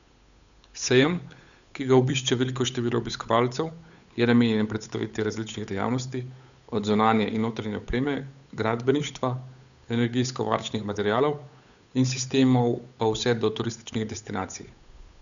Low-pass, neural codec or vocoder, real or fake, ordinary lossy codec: 7.2 kHz; codec, 16 kHz, 8 kbps, FunCodec, trained on Chinese and English, 25 frames a second; fake; none